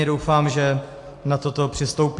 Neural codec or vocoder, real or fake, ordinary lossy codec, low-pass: none; real; AAC, 48 kbps; 10.8 kHz